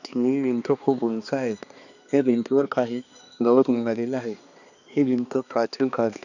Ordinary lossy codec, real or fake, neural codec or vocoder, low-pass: none; fake; codec, 16 kHz, 2 kbps, X-Codec, HuBERT features, trained on balanced general audio; 7.2 kHz